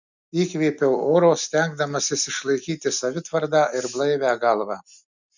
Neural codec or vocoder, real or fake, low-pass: none; real; 7.2 kHz